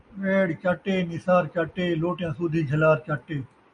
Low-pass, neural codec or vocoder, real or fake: 9.9 kHz; none; real